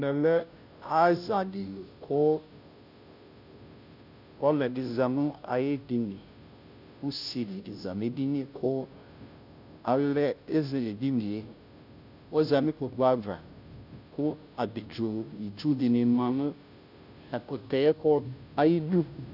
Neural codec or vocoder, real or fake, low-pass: codec, 16 kHz, 0.5 kbps, FunCodec, trained on Chinese and English, 25 frames a second; fake; 5.4 kHz